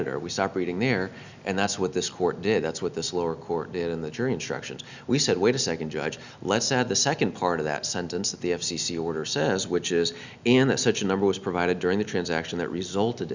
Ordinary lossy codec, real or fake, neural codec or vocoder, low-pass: Opus, 64 kbps; real; none; 7.2 kHz